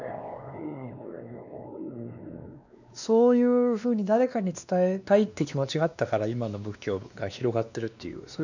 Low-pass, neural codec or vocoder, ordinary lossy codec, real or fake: 7.2 kHz; codec, 16 kHz, 2 kbps, X-Codec, WavLM features, trained on Multilingual LibriSpeech; none; fake